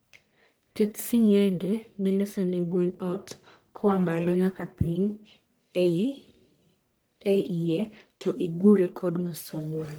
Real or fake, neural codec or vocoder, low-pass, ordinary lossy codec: fake; codec, 44.1 kHz, 1.7 kbps, Pupu-Codec; none; none